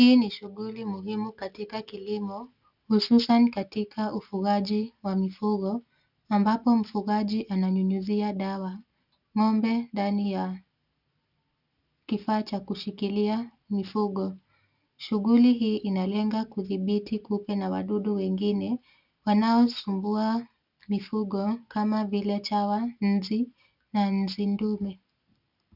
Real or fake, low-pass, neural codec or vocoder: real; 5.4 kHz; none